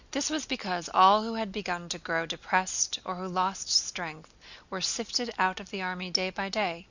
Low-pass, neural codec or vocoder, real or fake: 7.2 kHz; none; real